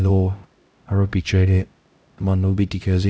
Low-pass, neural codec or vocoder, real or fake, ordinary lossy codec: none; codec, 16 kHz, 0.5 kbps, X-Codec, HuBERT features, trained on LibriSpeech; fake; none